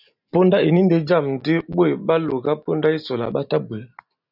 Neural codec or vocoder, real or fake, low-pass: none; real; 5.4 kHz